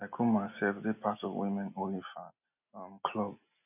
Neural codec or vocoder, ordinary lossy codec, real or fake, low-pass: none; none; real; 3.6 kHz